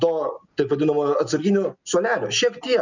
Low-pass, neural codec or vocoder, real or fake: 7.2 kHz; none; real